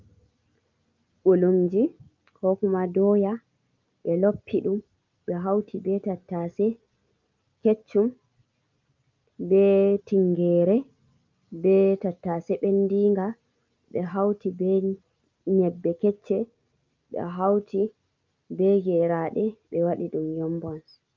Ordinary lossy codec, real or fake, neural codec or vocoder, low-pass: Opus, 24 kbps; real; none; 7.2 kHz